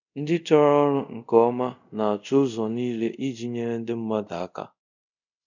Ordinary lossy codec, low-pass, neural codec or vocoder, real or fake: none; 7.2 kHz; codec, 24 kHz, 0.5 kbps, DualCodec; fake